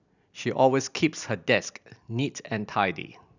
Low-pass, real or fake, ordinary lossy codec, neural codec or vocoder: 7.2 kHz; real; none; none